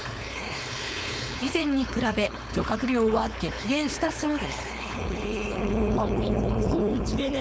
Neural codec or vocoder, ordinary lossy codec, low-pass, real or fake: codec, 16 kHz, 4.8 kbps, FACodec; none; none; fake